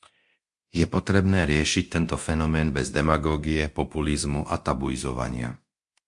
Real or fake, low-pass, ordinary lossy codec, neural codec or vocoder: fake; 10.8 kHz; MP3, 64 kbps; codec, 24 kHz, 0.9 kbps, DualCodec